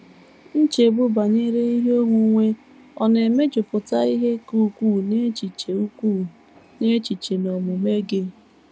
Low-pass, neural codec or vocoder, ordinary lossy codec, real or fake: none; none; none; real